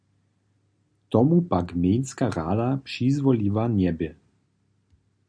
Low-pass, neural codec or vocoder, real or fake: 9.9 kHz; none; real